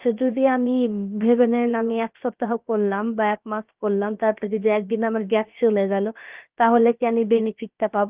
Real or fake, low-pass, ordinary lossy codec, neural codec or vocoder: fake; 3.6 kHz; Opus, 32 kbps; codec, 16 kHz, about 1 kbps, DyCAST, with the encoder's durations